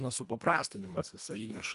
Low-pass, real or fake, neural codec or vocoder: 10.8 kHz; fake; codec, 24 kHz, 1.5 kbps, HILCodec